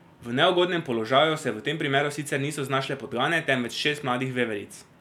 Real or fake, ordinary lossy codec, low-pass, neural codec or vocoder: real; none; 19.8 kHz; none